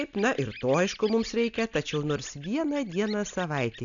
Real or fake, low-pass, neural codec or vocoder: real; 7.2 kHz; none